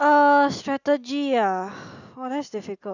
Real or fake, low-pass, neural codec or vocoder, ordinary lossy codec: real; 7.2 kHz; none; none